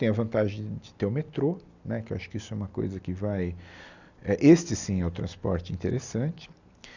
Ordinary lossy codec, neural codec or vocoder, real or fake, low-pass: none; none; real; 7.2 kHz